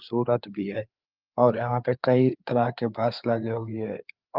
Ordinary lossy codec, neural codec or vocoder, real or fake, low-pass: Opus, 32 kbps; codec, 16 kHz, 4 kbps, FreqCodec, larger model; fake; 5.4 kHz